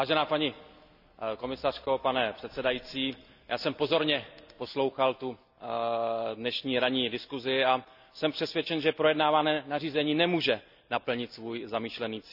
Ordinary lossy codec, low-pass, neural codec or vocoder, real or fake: none; 5.4 kHz; none; real